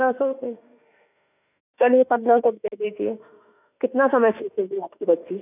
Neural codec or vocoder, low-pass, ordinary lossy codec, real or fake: autoencoder, 48 kHz, 32 numbers a frame, DAC-VAE, trained on Japanese speech; 3.6 kHz; none; fake